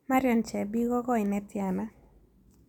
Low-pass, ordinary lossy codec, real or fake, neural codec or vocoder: 19.8 kHz; none; real; none